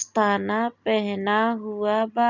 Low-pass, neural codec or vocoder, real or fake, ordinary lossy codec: 7.2 kHz; none; real; none